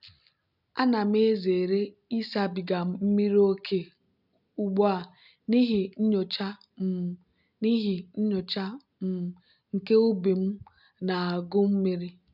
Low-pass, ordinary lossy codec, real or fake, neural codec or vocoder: 5.4 kHz; none; real; none